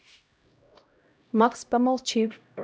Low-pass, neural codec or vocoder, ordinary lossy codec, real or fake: none; codec, 16 kHz, 0.5 kbps, X-Codec, HuBERT features, trained on LibriSpeech; none; fake